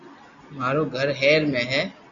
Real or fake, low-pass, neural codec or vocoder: real; 7.2 kHz; none